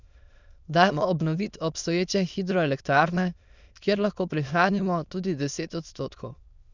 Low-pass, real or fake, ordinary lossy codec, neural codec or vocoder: 7.2 kHz; fake; none; autoencoder, 22.05 kHz, a latent of 192 numbers a frame, VITS, trained on many speakers